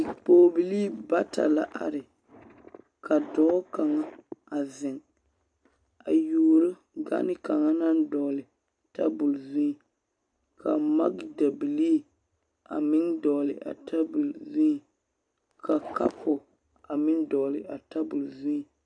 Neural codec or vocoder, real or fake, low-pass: none; real; 9.9 kHz